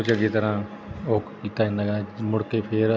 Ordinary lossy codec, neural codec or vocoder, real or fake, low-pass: none; none; real; none